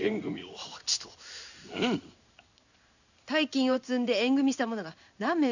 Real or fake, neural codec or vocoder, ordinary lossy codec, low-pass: fake; codec, 16 kHz in and 24 kHz out, 1 kbps, XY-Tokenizer; none; 7.2 kHz